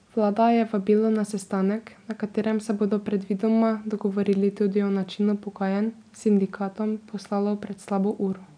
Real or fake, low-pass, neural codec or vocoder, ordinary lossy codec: real; 9.9 kHz; none; none